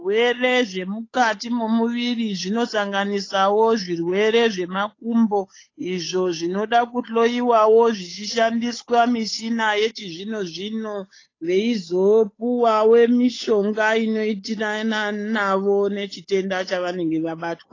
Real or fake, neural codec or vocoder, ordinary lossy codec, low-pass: fake; codec, 16 kHz, 8 kbps, FunCodec, trained on Chinese and English, 25 frames a second; AAC, 32 kbps; 7.2 kHz